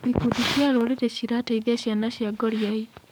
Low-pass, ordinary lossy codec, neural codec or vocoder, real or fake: none; none; codec, 44.1 kHz, 7.8 kbps, DAC; fake